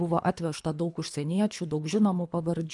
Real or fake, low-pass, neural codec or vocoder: fake; 10.8 kHz; codec, 24 kHz, 3 kbps, HILCodec